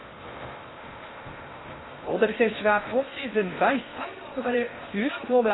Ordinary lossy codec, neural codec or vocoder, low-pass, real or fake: AAC, 16 kbps; codec, 16 kHz in and 24 kHz out, 0.6 kbps, FocalCodec, streaming, 2048 codes; 7.2 kHz; fake